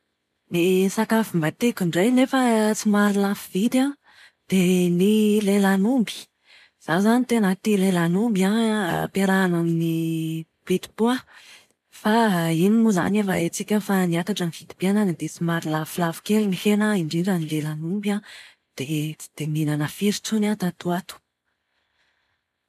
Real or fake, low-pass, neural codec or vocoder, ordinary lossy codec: real; 14.4 kHz; none; none